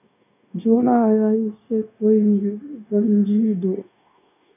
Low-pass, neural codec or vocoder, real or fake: 3.6 kHz; codec, 16 kHz, 0.9 kbps, LongCat-Audio-Codec; fake